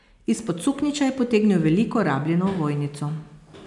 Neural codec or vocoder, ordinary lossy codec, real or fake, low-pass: none; MP3, 96 kbps; real; 10.8 kHz